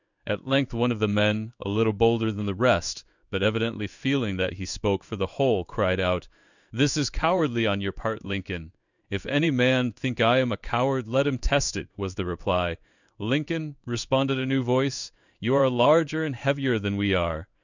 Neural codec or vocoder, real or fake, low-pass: codec, 16 kHz in and 24 kHz out, 1 kbps, XY-Tokenizer; fake; 7.2 kHz